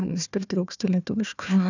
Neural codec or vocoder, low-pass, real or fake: codec, 16 kHz, 1 kbps, FunCodec, trained on Chinese and English, 50 frames a second; 7.2 kHz; fake